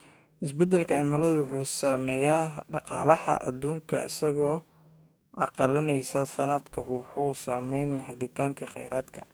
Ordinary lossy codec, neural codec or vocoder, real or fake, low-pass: none; codec, 44.1 kHz, 2.6 kbps, DAC; fake; none